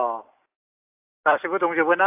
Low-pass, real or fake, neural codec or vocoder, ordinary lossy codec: 3.6 kHz; real; none; AAC, 24 kbps